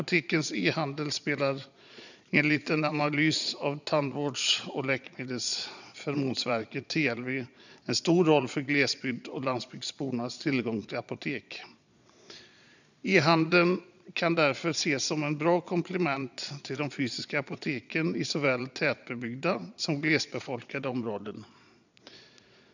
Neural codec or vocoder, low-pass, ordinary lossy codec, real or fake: vocoder, 22.05 kHz, 80 mel bands, Vocos; 7.2 kHz; none; fake